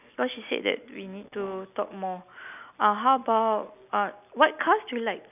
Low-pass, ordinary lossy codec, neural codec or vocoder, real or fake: 3.6 kHz; none; none; real